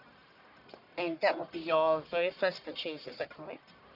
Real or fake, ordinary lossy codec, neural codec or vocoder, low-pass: fake; none; codec, 44.1 kHz, 1.7 kbps, Pupu-Codec; 5.4 kHz